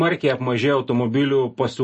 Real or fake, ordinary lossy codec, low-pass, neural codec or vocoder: real; MP3, 32 kbps; 10.8 kHz; none